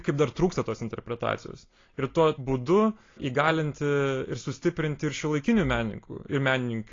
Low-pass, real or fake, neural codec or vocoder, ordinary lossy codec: 7.2 kHz; real; none; AAC, 32 kbps